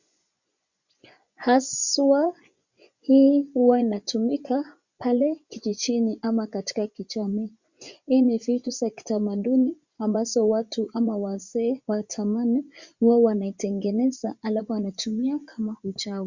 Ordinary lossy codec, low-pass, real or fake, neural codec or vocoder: Opus, 64 kbps; 7.2 kHz; fake; vocoder, 24 kHz, 100 mel bands, Vocos